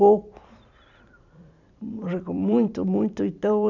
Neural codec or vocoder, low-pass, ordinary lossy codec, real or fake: vocoder, 44.1 kHz, 128 mel bands every 256 samples, BigVGAN v2; 7.2 kHz; none; fake